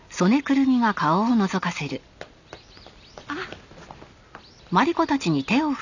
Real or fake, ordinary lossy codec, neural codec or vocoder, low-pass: real; none; none; 7.2 kHz